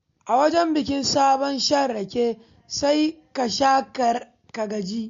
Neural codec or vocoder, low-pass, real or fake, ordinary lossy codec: none; 7.2 kHz; real; MP3, 48 kbps